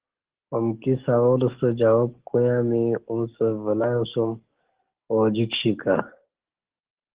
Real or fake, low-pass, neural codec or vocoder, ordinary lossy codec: fake; 3.6 kHz; codec, 44.1 kHz, 7.8 kbps, DAC; Opus, 16 kbps